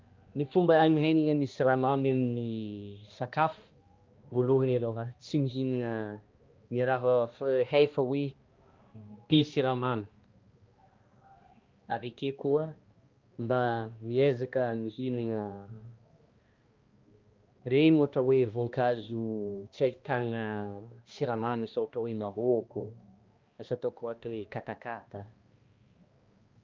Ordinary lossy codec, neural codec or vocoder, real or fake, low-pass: Opus, 24 kbps; codec, 16 kHz, 1 kbps, X-Codec, HuBERT features, trained on balanced general audio; fake; 7.2 kHz